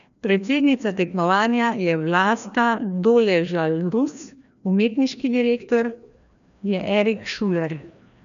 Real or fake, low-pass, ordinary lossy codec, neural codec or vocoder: fake; 7.2 kHz; none; codec, 16 kHz, 1 kbps, FreqCodec, larger model